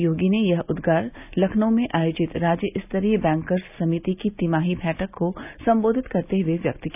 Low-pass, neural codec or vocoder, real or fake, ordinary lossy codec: 3.6 kHz; none; real; none